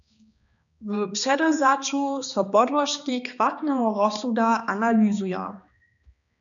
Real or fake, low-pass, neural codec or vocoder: fake; 7.2 kHz; codec, 16 kHz, 2 kbps, X-Codec, HuBERT features, trained on balanced general audio